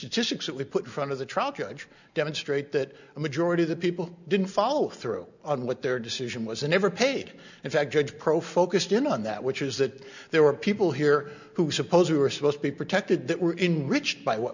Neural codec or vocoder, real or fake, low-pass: none; real; 7.2 kHz